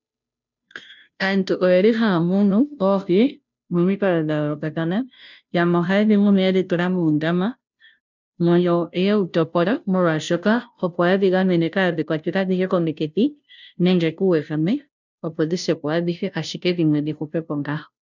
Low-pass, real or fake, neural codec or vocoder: 7.2 kHz; fake; codec, 16 kHz, 0.5 kbps, FunCodec, trained on Chinese and English, 25 frames a second